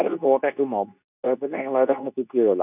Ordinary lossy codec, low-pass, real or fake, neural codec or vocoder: none; 3.6 kHz; fake; codec, 24 kHz, 0.9 kbps, WavTokenizer, medium speech release version 2